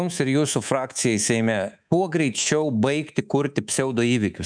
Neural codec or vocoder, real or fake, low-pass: codec, 24 kHz, 3.1 kbps, DualCodec; fake; 9.9 kHz